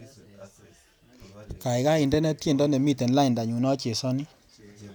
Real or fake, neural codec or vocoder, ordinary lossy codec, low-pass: real; none; none; none